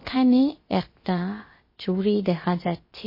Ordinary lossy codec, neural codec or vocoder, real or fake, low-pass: MP3, 24 kbps; codec, 16 kHz, about 1 kbps, DyCAST, with the encoder's durations; fake; 5.4 kHz